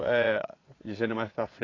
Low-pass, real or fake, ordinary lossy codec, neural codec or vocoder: 7.2 kHz; fake; AAC, 32 kbps; vocoder, 22.05 kHz, 80 mel bands, Vocos